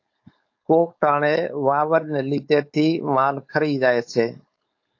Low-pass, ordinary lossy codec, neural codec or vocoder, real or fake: 7.2 kHz; AAC, 48 kbps; codec, 16 kHz, 4.8 kbps, FACodec; fake